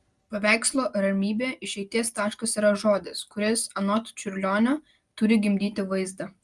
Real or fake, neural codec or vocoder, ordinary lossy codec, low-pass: real; none; Opus, 32 kbps; 10.8 kHz